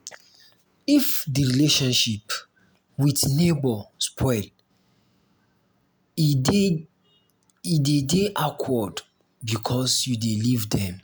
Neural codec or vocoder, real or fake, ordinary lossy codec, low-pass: none; real; none; none